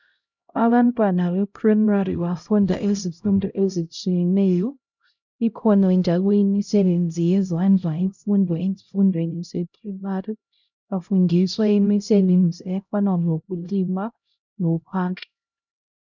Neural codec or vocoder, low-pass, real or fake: codec, 16 kHz, 0.5 kbps, X-Codec, HuBERT features, trained on LibriSpeech; 7.2 kHz; fake